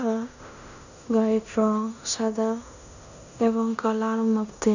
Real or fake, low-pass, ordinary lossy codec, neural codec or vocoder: fake; 7.2 kHz; none; codec, 16 kHz in and 24 kHz out, 0.9 kbps, LongCat-Audio-Codec, fine tuned four codebook decoder